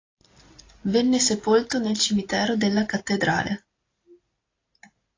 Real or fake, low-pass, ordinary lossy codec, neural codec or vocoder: real; 7.2 kHz; AAC, 32 kbps; none